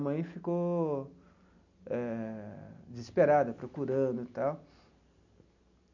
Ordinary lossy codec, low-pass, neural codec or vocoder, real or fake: none; 7.2 kHz; none; real